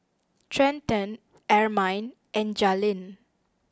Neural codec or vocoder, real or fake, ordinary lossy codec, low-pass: none; real; none; none